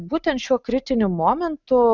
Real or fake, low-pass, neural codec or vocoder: real; 7.2 kHz; none